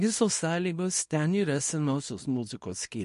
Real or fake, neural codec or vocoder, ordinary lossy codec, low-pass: fake; codec, 24 kHz, 0.9 kbps, WavTokenizer, small release; MP3, 48 kbps; 10.8 kHz